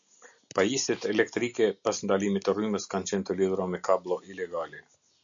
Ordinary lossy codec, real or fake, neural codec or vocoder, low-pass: MP3, 64 kbps; real; none; 7.2 kHz